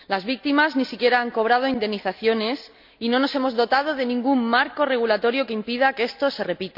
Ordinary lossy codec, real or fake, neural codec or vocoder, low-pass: none; real; none; 5.4 kHz